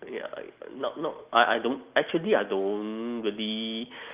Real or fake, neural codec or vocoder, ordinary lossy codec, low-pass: real; none; Opus, 24 kbps; 3.6 kHz